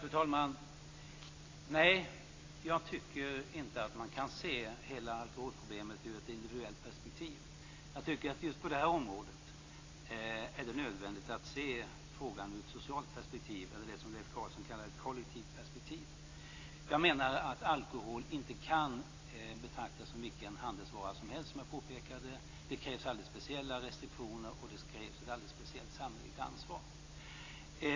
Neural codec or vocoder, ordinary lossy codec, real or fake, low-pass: none; AAC, 32 kbps; real; 7.2 kHz